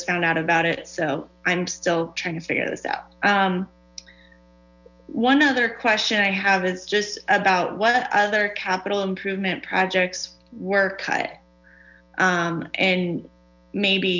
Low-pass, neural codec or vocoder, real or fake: 7.2 kHz; none; real